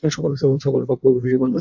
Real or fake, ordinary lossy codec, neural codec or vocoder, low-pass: fake; AAC, 48 kbps; codec, 16 kHz in and 24 kHz out, 1.1 kbps, FireRedTTS-2 codec; 7.2 kHz